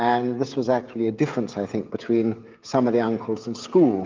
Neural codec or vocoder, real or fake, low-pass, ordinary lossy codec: codec, 16 kHz, 16 kbps, FreqCodec, smaller model; fake; 7.2 kHz; Opus, 32 kbps